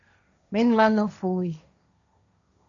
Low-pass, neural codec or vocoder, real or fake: 7.2 kHz; codec, 16 kHz, 1.1 kbps, Voila-Tokenizer; fake